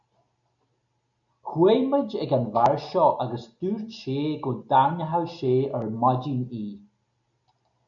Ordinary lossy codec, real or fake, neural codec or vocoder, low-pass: MP3, 96 kbps; real; none; 7.2 kHz